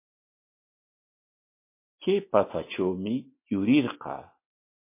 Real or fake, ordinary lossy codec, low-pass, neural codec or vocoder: real; MP3, 24 kbps; 3.6 kHz; none